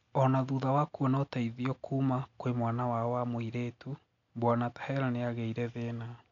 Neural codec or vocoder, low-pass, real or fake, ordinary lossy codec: none; 7.2 kHz; real; none